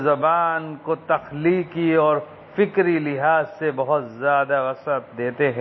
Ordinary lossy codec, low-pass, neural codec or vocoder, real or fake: MP3, 24 kbps; 7.2 kHz; none; real